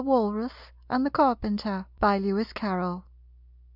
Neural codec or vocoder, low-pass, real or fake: none; 5.4 kHz; real